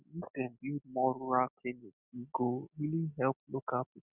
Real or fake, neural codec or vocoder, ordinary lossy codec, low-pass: real; none; none; 3.6 kHz